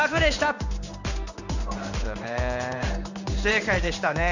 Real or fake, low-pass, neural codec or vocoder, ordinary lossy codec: fake; 7.2 kHz; codec, 16 kHz in and 24 kHz out, 1 kbps, XY-Tokenizer; none